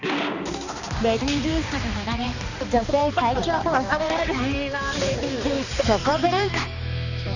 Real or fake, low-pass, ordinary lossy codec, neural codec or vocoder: fake; 7.2 kHz; none; codec, 16 kHz, 2 kbps, X-Codec, HuBERT features, trained on balanced general audio